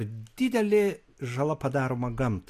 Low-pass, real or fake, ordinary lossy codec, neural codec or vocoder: 14.4 kHz; real; AAC, 64 kbps; none